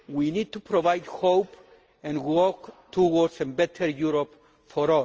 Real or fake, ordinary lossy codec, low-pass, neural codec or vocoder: real; Opus, 24 kbps; 7.2 kHz; none